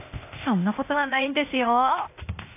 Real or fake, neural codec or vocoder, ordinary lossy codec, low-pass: fake; codec, 16 kHz, 0.8 kbps, ZipCodec; AAC, 32 kbps; 3.6 kHz